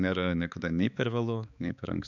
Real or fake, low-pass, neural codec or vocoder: fake; 7.2 kHz; codec, 16 kHz, 4 kbps, X-Codec, HuBERT features, trained on balanced general audio